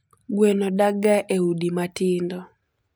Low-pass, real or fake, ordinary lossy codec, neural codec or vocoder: none; real; none; none